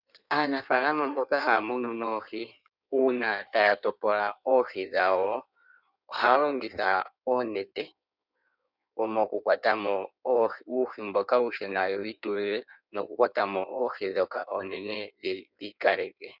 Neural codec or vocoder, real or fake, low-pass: codec, 16 kHz in and 24 kHz out, 1.1 kbps, FireRedTTS-2 codec; fake; 5.4 kHz